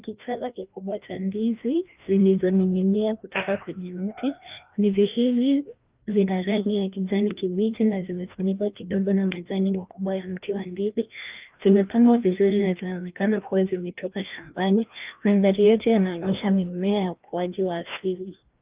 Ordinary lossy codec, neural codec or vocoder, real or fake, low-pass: Opus, 32 kbps; codec, 16 kHz, 1 kbps, FreqCodec, larger model; fake; 3.6 kHz